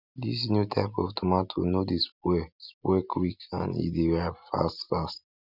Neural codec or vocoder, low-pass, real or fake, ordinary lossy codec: none; 5.4 kHz; real; none